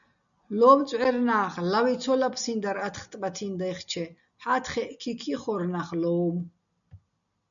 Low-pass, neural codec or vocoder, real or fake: 7.2 kHz; none; real